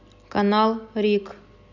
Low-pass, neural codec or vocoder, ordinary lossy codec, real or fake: 7.2 kHz; none; none; real